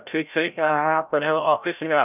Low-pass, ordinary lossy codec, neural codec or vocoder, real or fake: 3.6 kHz; none; codec, 16 kHz, 0.5 kbps, FreqCodec, larger model; fake